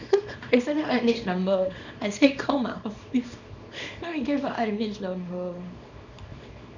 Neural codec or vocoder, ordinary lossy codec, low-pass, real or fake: codec, 24 kHz, 0.9 kbps, WavTokenizer, small release; none; 7.2 kHz; fake